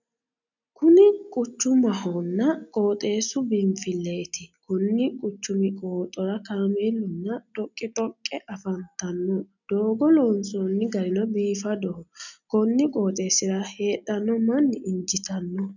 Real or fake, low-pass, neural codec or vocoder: real; 7.2 kHz; none